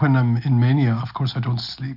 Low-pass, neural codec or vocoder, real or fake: 5.4 kHz; none; real